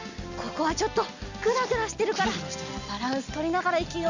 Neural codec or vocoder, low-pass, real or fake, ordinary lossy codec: none; 7.2 kHz; real; none